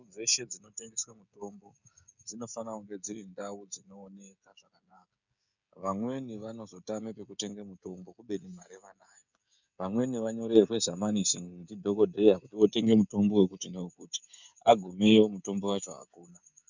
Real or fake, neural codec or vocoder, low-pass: fake; codec, 16 kHz, 16 kbps, FreqCodec, smaller model; 7.2 kHz